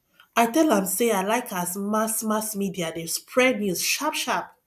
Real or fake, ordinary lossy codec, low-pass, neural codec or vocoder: real; none; 14.4 kHz; none